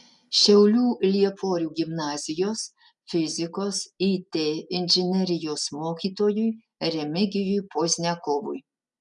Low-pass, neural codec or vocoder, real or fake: 10.8 kHz; none; real